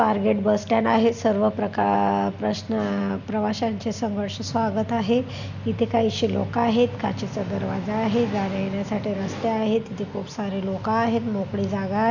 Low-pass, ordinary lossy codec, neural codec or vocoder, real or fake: 7.2 kHz; none; none; real